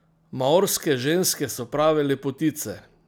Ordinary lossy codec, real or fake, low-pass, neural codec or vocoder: none; real; none; none